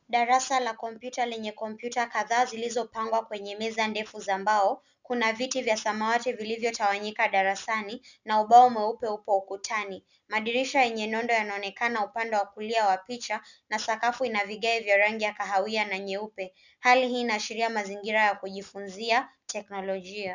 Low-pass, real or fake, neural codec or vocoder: 7.2 kHz; real; none